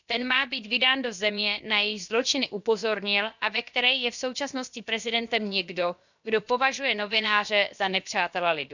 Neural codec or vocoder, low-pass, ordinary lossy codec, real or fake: codec, 16 kHz, about 1 kbps, DyCAST, with the encoder's durations; 7.2 kHz; none; fake